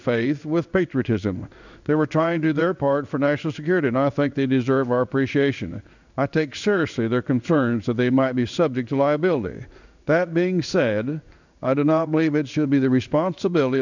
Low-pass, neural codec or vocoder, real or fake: 7.2 kHz; codec, 16 kHz in and 24 kHz out, 1 kbps, XY-Tokenizer; fake